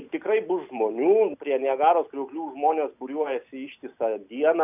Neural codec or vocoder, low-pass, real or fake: none; 3.6 kHz; real